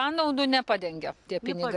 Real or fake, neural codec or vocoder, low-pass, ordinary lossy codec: real; none; 10.8 kHz; AAC, 64 kbps